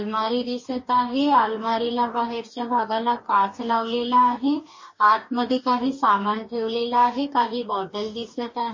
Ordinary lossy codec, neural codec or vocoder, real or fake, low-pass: MP3, 32 kbps; codec, 44.1 kHz, 2.6 kbps, DAC; fake; 7.2 kHz